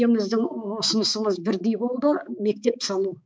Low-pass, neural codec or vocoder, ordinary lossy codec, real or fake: none; codec, 16 kHz, 4 kbps, X-Codec, HuBERT features, trained on balanced general audio; none; fake